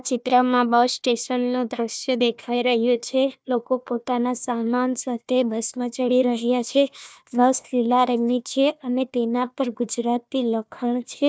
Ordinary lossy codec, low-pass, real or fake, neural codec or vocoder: none; none; fake; codec, 16 kHz, 1 kbps, FunCodec, trained on Chinese and English, 50 frames a second